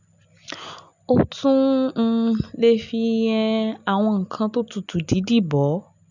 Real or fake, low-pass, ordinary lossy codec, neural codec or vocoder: real; 7.2 kHz; none; none